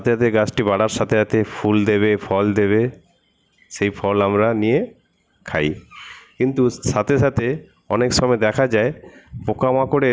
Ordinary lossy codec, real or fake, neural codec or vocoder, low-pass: none; real; none; none